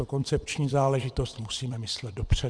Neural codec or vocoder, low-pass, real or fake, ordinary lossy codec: vocoder, 22.05 kHz, 80 mel bands, WaveNeXt; 9.9 kHz; fake; Opus, 64 kbps